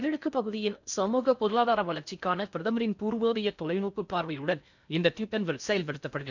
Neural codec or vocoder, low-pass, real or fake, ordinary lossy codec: codec, 16 kHz in and 24 kHz out, 0.6 kbps, FocalCodec, streaming, 4096 codes; 7.2 kHz; fake; none